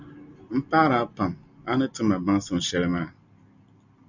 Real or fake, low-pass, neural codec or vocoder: real; 7.2 kHz; none